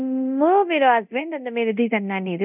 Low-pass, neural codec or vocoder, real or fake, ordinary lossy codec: 3.6 kHz; codec, 24 kHz, 0.9 kbps, DualCodec; fake; none